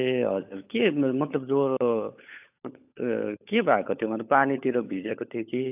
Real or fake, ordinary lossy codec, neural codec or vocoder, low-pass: fake; AAC, 32 kbps; codec, 16 kHz, 16 kbps, FunCodec, trained on LibriTTS, 50 frames a second; 3.6 kHz